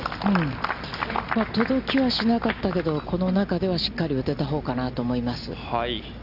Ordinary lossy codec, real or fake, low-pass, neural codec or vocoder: Opus, 64 kbps; real; 5.4 kHz; none